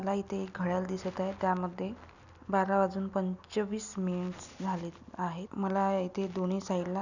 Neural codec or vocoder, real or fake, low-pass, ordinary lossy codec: none; real; 7.2 kHz; none